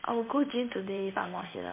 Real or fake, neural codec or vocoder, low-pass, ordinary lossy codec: fake; codec, 16 kHz in and 24 kHz out, 1 kbps, XY-Tokenizer; 3.6 kHz; MP3, 32 kbps